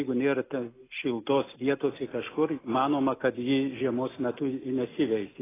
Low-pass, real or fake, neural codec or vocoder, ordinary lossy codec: 3.6 kHz; real; none; AAC, 16 kbps